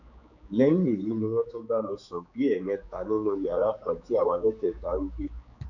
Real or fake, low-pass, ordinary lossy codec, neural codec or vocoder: fake; 7.2 kHz; none; codec, 16 kHz, 4 kbps, X-Codec, HuBERT features, trained on general audio